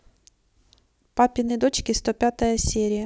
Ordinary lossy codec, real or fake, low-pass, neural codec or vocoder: none; real; none; none